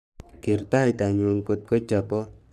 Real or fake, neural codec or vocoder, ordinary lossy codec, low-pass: fake; codec, 44.1 kHz, 3.4 kbps, Pupu-Codec; none; 14.4 kHz